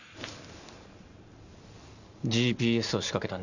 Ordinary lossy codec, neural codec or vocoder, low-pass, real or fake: none; none; 7.2 kHz; real